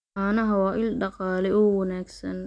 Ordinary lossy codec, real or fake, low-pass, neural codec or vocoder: none; real; 9.9 kHz; none